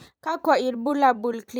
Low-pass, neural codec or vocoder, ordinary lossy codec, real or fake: none; vocoder, 44.1 kHz, 128 mel bands every 256 samples, BigVGAN v2; none; fake